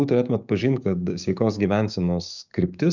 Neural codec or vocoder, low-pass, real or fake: codec, 16 kHz, 6 kbps, DAC; 7.2 kHz; fake